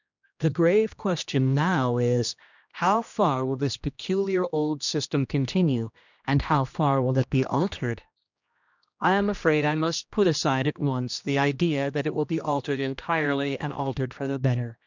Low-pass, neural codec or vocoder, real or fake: 7.2 kHz; codec, 16 kHz, 1 kbps, X-Codec, HuBERT features, trained on general audio; fake